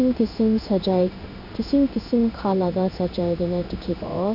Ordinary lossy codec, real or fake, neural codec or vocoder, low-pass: none; fake; codec, 16 kHz in and 24 kHz out, 1 kbps, XY-Tokenizer; 5.4 kHz